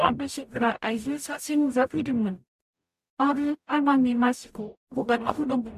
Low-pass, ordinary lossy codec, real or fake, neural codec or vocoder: 14.4 kHz; MP3, 64 kbps; fake; codec, 44.1 kHz, 0.9 kbps, DAC